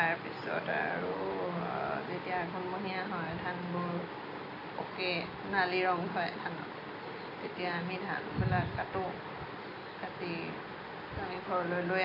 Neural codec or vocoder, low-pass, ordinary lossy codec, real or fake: none; 5.4 kHz; none; real